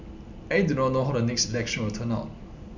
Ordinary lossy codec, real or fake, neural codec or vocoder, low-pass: none; real; none; 7.2 kHz